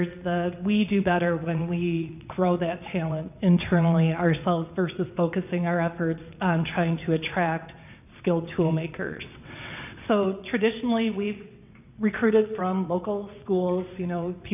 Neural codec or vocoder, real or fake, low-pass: vocoder, 22.05 kHz, 80 mel bands, WaveNeXt; fake; 3.6 kHz